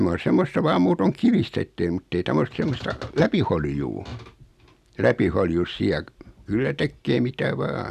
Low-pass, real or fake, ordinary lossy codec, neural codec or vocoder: 14.4 kHz; real; none; none